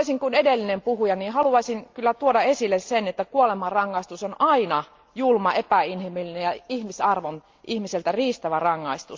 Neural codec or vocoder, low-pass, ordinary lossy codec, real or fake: none; 7.2 kHz; Opus, 24 kbps; real